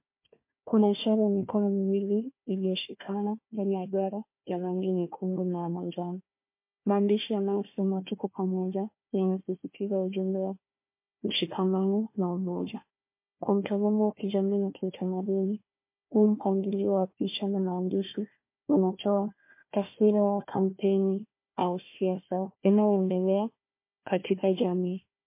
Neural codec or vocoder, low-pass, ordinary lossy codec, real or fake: codec, 16 kHz, 1 kbps, FunCodec, trained on Chinese and English, 50 frames a second; 3.6 kHz; MP3, 24 kbps; fake